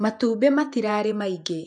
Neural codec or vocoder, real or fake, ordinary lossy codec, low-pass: vocoder, 48 kHz, 128 mel bands, Vocos; fake; none; 10.8 kHz